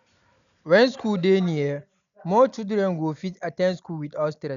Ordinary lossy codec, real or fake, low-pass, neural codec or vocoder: none; real; 7.2 kHz; none